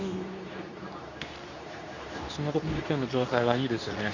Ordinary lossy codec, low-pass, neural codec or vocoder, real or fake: none; 7.2 kHz; codec, 24 kHz, 0.9 kbps, WavTokenizer, medium speech release version 2; fake